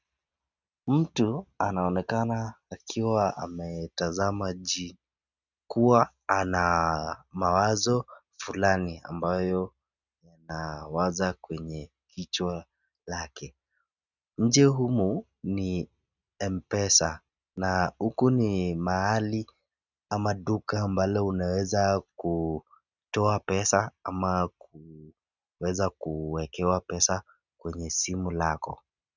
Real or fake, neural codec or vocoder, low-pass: real; none; 7.2 kHz